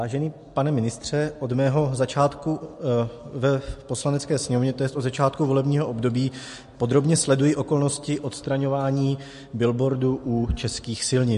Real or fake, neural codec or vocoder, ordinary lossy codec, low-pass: real; none; MP3, 48 kbps; 14.4 kHz